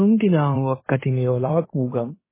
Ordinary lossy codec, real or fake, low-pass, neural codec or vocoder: MP3, 16 kbps; fake; 3.6 kHz; codec, 16 kHz in and 24 kHz out, 0.9 kbps, LongCat-Audio-Codec, fine tuned four codebook decoder